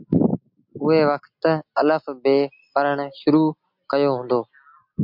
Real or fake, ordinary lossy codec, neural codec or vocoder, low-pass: real; MP3, 48 kbps; none; 5.4 kHz